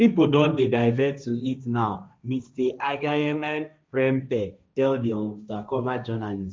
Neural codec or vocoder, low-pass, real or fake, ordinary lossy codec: codec, 16 kHz, 1.1 kbps, Voila-Tokenizer; none; fake; none